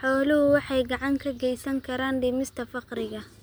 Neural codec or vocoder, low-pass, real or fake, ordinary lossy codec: none; none; real; none